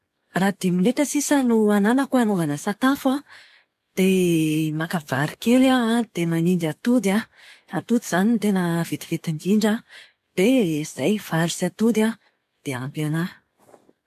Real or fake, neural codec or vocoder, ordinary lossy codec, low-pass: fake; codec, 44.1 kHz, 7.8 kbps, DAC; none; 14.4 kHz